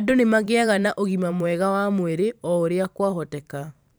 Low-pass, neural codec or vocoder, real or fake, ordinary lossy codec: none; vocoder, 44.1 kHz, 128 mel bands every 512 samples, BigVGAN v2; fake; none